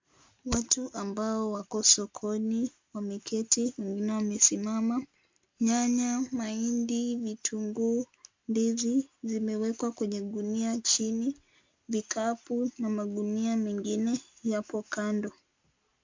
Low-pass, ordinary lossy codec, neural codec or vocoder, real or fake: 7.2 kHz; MP3, 48 kbps; none; real